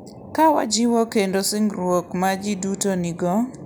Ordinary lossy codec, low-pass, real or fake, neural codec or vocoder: none; none; real; none